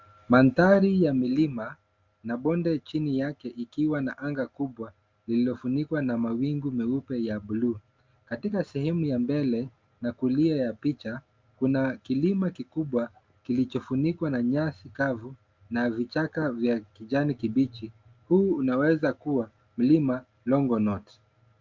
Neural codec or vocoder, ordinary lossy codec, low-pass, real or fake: none; Opus, 32 kbps; 7.2 kHz; real